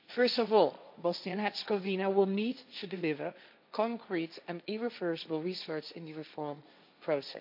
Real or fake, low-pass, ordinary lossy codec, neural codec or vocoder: fake; 5.4 kHz; MP3, 48 kbps; codec, 16 kHz, 1.1 kbps, Voila-Tokenizer